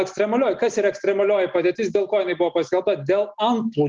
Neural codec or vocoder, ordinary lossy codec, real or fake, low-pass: none; Opus, 24 kbps; real; 7.2 kHz